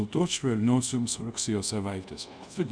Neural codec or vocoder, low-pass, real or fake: codec, 24 kHz, 0.5 kbps, DualCodec; 9.9 kHz; fake